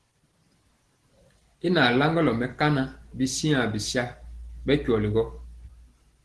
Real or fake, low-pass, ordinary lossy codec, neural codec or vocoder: real; 10.8 kHz; Opus, 16 kbps; none